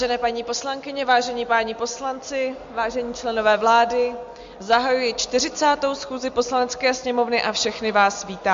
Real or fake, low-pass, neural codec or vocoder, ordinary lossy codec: real; 7.2 kHz; none; MP3, 48 kbps